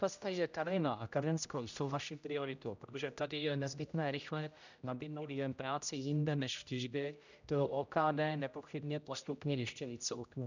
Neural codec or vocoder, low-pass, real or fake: codec, 16 kHz, 0.5 kbps, X-Codec, HuBERT features, trained on general audio; 7.2 kHz; fake